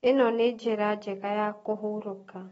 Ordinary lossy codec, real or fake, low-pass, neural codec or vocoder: AAC, 24 kbps; real; 19.8 kHz; none